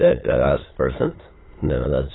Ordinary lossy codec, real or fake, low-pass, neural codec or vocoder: AAC, 16 kbps; fake; 7.2 kHz; autoencoder, 22.05 kHz, a latent of 192 numbers a frame, VITS, trained on many speakers